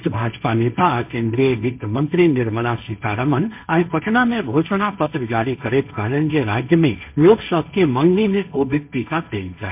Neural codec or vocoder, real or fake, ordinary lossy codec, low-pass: codec, 16 kHz, 1.1 kbps, Voila-Tokenizer; fake; MP3, 32 kbps; 3.6 kHz